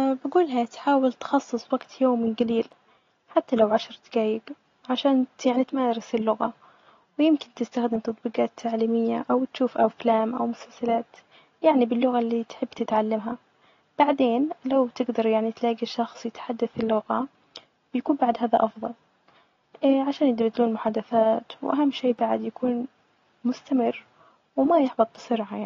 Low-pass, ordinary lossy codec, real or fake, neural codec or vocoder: 7.2 kHz; AAC, 32 kbps; real; none